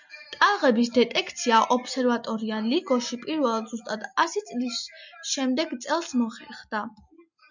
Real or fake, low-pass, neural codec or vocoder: real; 7.2 kHz; none